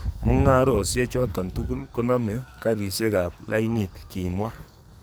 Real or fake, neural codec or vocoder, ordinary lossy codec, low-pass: fake; codec, 44.1 kHz, 2.6 kbps, SNAC; none; none